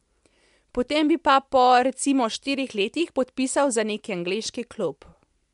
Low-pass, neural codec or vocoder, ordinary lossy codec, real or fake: 10.8 kHz; none; MP3, 64 kbps; real